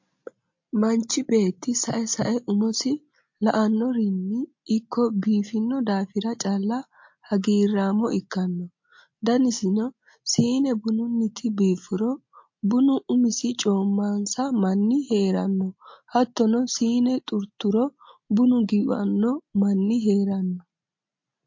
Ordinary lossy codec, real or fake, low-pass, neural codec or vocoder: MP3, 48 kbps; real; 7.2 kHz; none